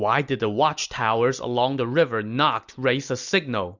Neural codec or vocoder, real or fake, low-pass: none; real; 7.2 kHz